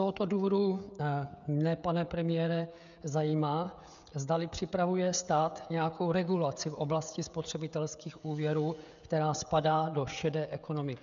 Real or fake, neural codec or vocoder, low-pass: fake; codec, 16 kHz, 16 kbps, FreqCodec, smaller model; 7.2 kHz